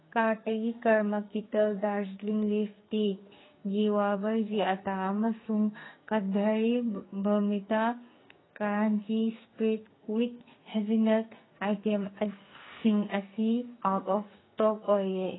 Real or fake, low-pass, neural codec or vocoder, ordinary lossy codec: fake; 7.2 kHz; codec, 44.1 kHz, 2.6 kbps, SNAC; AAC, 16 kbps